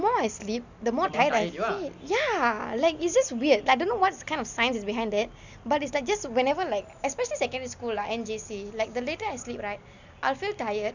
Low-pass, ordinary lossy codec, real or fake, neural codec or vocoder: 7.2 kHz; none; real; none